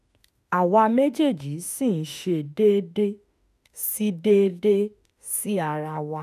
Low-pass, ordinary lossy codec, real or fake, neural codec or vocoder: 14.4 kHz; none; fake; autoencoder, 48 kHz, 32 numbers a frame, DAC-VAE, trained on Japanese speech